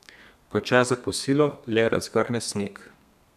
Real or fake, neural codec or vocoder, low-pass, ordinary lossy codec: fake; codec, 32 kHz, 1.9 kbps, SNAC; 14.4 kHz; none